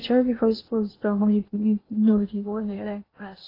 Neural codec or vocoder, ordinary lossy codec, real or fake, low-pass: codec, 16 kHz in and 24 kHz out, 0.8 kbps, FocalCodec, streaming, 65536 codes; AAC, 24 kbps; fake; 5.4 kHz